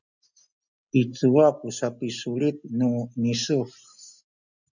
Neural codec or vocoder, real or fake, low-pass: none; real; 7.2 kHz